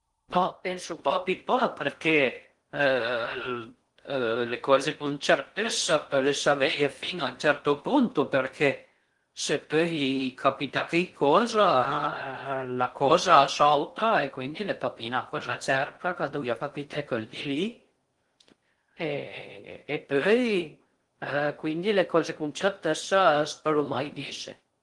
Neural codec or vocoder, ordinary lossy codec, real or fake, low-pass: codec, 16 kHz in and 24 kHz out, 0.6 kbps, FocalCodec, streaming, 4096 codes; Opus, 24 kbps; fake; 10.8 kHz